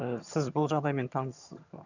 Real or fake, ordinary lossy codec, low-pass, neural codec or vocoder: fake; none; 7.2 kHz; vocoder, 22.05 kHz, 80 mel bands, HiFi-GAN